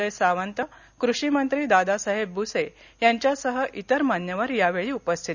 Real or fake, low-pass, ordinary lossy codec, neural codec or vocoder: real; 7.2 kHz; none; none